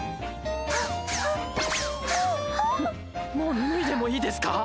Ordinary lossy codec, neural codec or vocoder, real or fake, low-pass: none; none; real; none